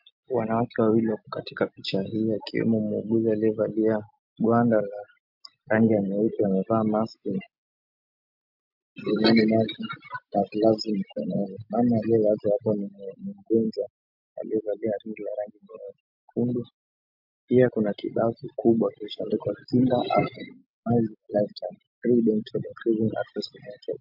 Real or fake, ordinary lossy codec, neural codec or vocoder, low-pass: real; MP3, 48 kbps; none; 5.4 kHz